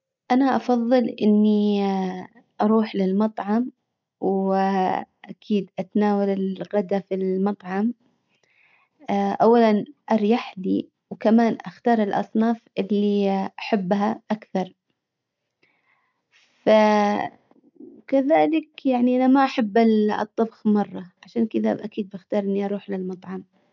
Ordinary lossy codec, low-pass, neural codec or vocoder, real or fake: none; 7.2 kHz; none; real